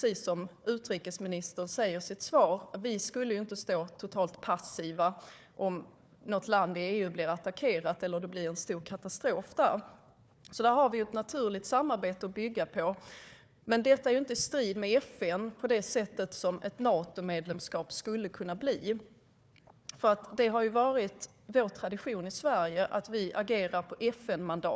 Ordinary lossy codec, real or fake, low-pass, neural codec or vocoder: none; fake; none; codec, 16 kHz, 4 kbps, FunCodec, trained on Chinese and English, 50 frames a second